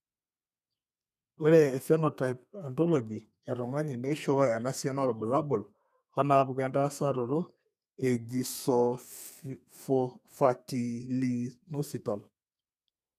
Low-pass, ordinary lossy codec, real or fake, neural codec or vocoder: 14.4 kHz; none; fake; codec, 32 kHz, 1.9 kbps, SNAC